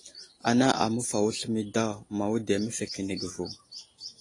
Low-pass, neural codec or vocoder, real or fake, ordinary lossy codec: 10.8 kHz; none; real; AAC, 32 kbps